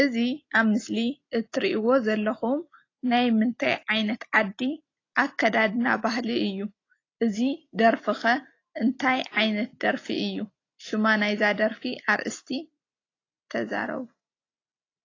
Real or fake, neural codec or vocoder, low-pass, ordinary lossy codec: real; none; 7.2 kHz; AAC, 32 kbps